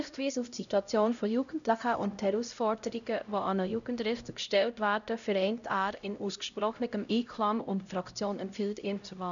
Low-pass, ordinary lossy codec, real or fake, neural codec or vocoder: 7.2 kHz; none; fake; codec, 16 kHz, 0.5 kbps, X-Codec, HuBERT features, trained on LibriSpeech